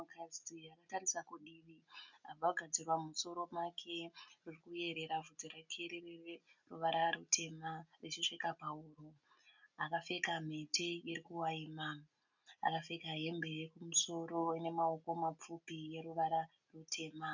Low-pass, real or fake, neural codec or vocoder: 7.2 kHz; real; none